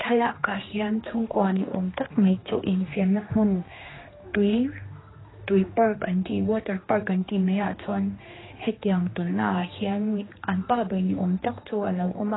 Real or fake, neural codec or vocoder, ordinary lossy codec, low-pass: fake; codec, 16 kHz, 2 kbps, X-Codec, HuBERT features, trained on general audio; AAC, 16 kbps; 7.2 kHz